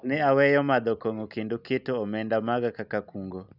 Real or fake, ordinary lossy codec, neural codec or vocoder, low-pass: real; none; none; 5.4 kHz